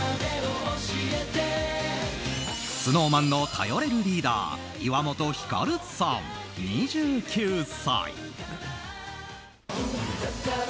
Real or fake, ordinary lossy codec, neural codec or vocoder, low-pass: real; none; none; none